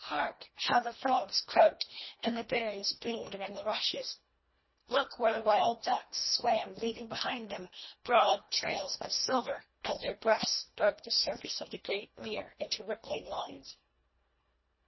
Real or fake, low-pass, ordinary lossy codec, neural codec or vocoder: fake; 7.2 kHz; MP3, 24 kbps; codec, 24 kHz, 1.5 kbps, HILCodec